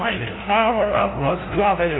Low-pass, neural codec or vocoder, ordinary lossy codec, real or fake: 7.2 kHz; codec, 16 kHz, 0.5 kbps, FunCodec, trained on LibriTTS, 25 frames a second; AAC, 16 kbps; fake